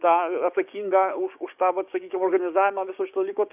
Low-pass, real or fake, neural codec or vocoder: 3.6 kHz; fake; codec, 16 kHz, 6 kbps, DAC